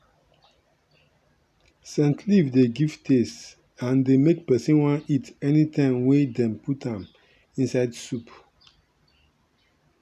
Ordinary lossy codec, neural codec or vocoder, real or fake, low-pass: none; none; real; 14.4 kHz